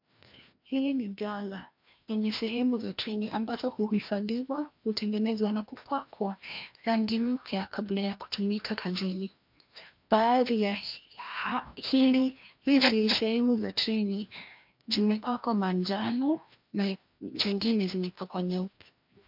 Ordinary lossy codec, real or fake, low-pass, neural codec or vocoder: MP3, 48 kbps; fake; 5.4 kHz; codec, 16 kHz, 1 kbps, FreqCodec, larger model